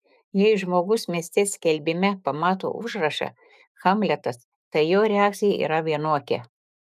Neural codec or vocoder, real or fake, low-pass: autoencoder, 48 kHz, 128 numbers a frame, DAC-VAE, trained on Japanese speech; fake; 14.4 kHz